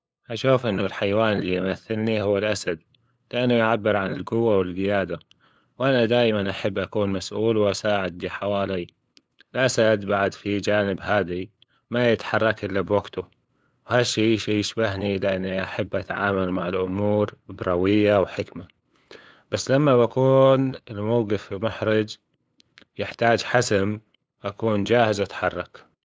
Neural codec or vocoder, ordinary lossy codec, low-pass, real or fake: codec, 16 kHz, 8 kbps, FunCodec, trained on LibriTTS, 25 frames a second; none; none; fake